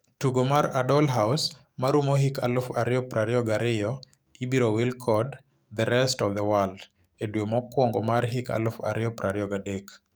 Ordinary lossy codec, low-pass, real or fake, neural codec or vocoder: none; none; fake; codec, 44.1 kHz, 7.8 kbps, DAC